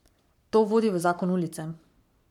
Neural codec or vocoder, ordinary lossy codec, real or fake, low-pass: codec, 44.1 kHz, 7.8 kbps, Pupu-Codec; none; fake; 19.8 kHz